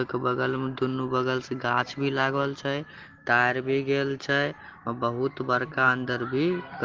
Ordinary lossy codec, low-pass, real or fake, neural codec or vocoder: Opus, 16 kbps; 7.2 kHz; real; none